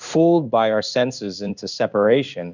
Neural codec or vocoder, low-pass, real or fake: codec, 16 kHz in and 24 kHz out, 1 kbps, XY-Tokenizer; 7.2 kHz; fake